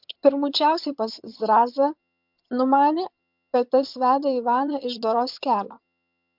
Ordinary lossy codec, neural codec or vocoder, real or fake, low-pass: MP3, 48 kbps; vocoder, 22.05 kHz, 80 mel bands, HiFi-GAN; fake; 5.4 kHz